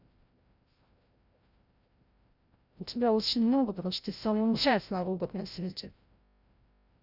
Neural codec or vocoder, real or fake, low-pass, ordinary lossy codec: codec, 16 kHz, 0.5 kbps, FreqCodec, larger model; fake; 5.4 kHz; Opus, 64 kbps